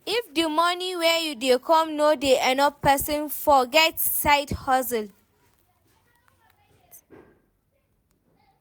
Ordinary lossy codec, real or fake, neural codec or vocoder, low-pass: none; real; none; none